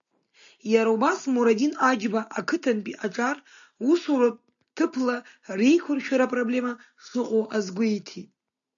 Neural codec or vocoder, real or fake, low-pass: none; real; 7.2 kHz